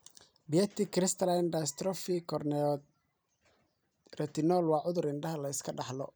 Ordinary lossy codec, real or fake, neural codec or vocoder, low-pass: none; fake; vocoder, 44.1 kHz, 128 mel bands every 256 samples, BigVGAN v2; none